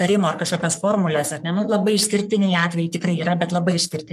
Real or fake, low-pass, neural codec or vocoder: fake; 14.4 kHz; codec, 44.1 kHz, 3.4 kbps, Pupu-Codec